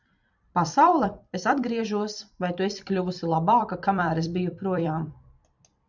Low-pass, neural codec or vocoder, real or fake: 7.2 kHz; vocoder, 44.1 kHz, 128 mel bands every 256 samples, BigVGAN v2; fake